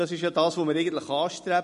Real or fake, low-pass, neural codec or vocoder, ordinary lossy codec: real; 14.4 kHz; none; MP3, 48 kbps